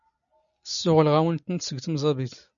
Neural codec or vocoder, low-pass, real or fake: none; 7.2 kHz; real